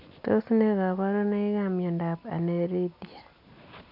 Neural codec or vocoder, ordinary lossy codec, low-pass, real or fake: none; none; 5.4 kHz; real